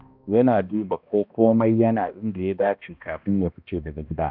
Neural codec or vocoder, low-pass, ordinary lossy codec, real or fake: codec, 16 kHz, 1 kbps, X-Codec, HuBERT features, trained on balanced general audio; 5.4 kHz; none; fake